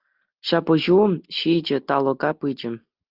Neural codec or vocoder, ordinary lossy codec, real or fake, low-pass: none; Opus, 32 kbps; real; 5.4 kHz